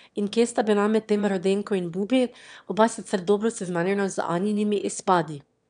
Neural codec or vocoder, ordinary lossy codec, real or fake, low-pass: autoencoder, 22.05 kHz, a latent of 192 numbers a frame, VITS, trained on one speaker; none; fake; 9.9 kHz